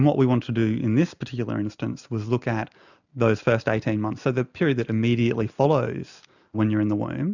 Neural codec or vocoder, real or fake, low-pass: none; real; 7.2 kHz